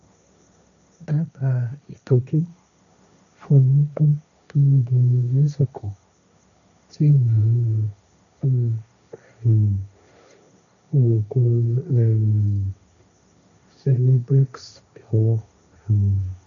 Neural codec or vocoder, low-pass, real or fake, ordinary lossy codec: codec, 16 kHz, 1.1 kbps, Voila-Tokenizer; 7.2 kHz; fake; none